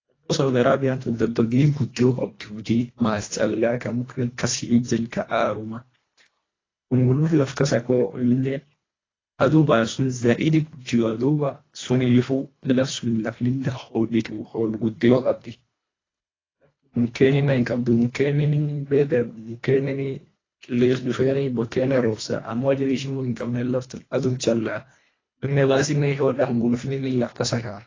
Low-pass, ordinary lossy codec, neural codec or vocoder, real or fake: 7.2 kHz; AAC, 32 kbps; codec, 24 kHz, 1.5 kbps, HILCodec; fake